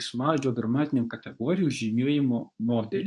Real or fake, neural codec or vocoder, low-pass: fake; codec, 24 kHz, 0.9 kbps, WavTokenizer, medium speech release version 2; 10.8 kHz